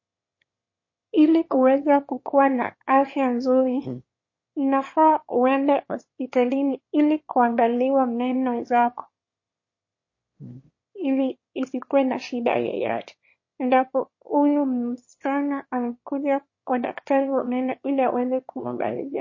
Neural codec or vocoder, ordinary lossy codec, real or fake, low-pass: autoencoder, 22.05 kHz, a latent of 192 numbers a frame, VITS, trained on one speaker; MP3, 32 kbps; fake; 7.2 kHz